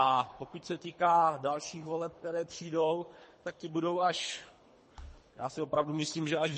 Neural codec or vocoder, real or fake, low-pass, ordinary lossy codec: codec, 24 kHz, 3 kbps, HILCodec; fake; 10.8 kHz; MP3, 32 kbps